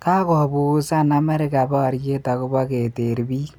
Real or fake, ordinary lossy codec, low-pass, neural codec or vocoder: real; none; none; none